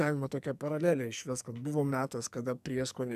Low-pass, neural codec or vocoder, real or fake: 14.4 kHz; codec, 44.1 kHz, 2.6 kbps, SNAC; fake